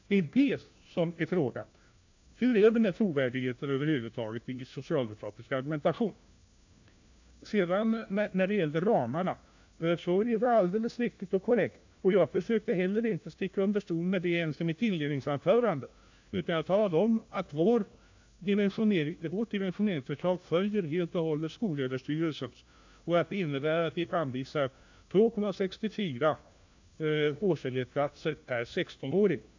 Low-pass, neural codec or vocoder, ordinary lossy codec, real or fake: 7.2 kHz; codec, 16 kHz, 1 kbps, FunCodec, trained on LibriTTS, 50 frames a second; AAC, 48 kbps; fake